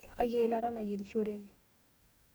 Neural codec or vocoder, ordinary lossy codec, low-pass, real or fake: codec, 44.1 kHz, 2.6 kbps, DAC; none; none; fake